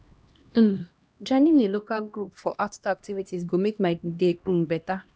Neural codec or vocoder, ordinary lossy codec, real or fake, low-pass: codec, 16 kHz, 1 kbps, X-Codec, HuBERT features, trained on LibriSpeech; none; fake; none